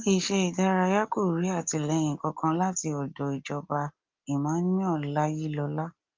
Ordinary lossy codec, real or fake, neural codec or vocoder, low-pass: Opus, 32 kbps; real; none; 7.2 kHz